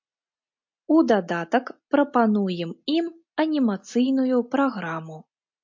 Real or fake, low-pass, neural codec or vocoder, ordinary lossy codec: real; 7.2 kHz; none; MP3, 48 kbps